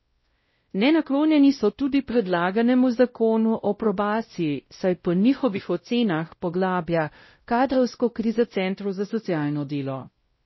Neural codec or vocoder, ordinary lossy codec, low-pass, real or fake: codec, 16 kHz, 0.5 kbps, X-Codec, WavLM features, trained on Multilingual LibriSpeech; MP3, 24 kbps; 7.2 kHz; fake